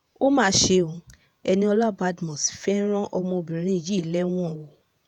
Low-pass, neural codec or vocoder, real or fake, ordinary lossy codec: 19.8 kHz; vocoder, 48 kHz, 128 mel bands, Vocos; fake; none